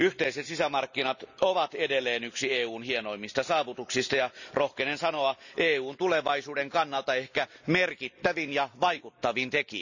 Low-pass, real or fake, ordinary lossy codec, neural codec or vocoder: 7.2 kHz; real; none; none